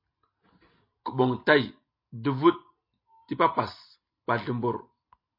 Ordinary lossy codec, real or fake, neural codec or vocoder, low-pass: MP3, 32 kbps; real; none; 5.4 kHz